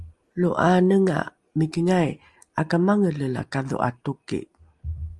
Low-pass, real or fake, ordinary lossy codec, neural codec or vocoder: 10.8 kHz; real; Opus, 32 kbps; none